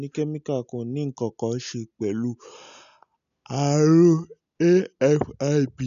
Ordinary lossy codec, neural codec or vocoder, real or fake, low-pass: none; none; real; 7.2 kHz